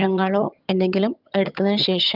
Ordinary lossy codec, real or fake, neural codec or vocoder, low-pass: Opus, 24 kbps; fake; vocoder, 22.05 kHz, 80 mel bands, HiFi-GAN; 5.4 kHz